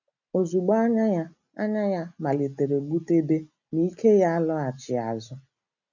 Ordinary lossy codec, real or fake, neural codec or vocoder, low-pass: none; real; none; 7.2 kHz